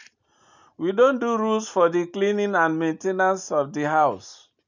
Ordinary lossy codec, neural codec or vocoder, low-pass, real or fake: none; none; 7.2 kHz; real